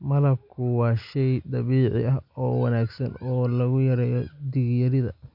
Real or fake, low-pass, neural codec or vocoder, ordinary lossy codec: real; 5.4 kHz; none; none